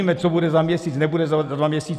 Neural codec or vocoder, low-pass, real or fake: none; 14.4 kHz; real